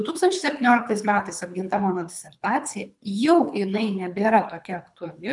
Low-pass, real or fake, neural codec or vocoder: 10.8 kHz; fake; codec, 24 kHz, 3 kbps, HILCodec